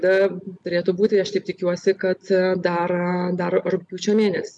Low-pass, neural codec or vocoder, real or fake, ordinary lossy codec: 10.8 kHz; none; real; MP3, 96 kbps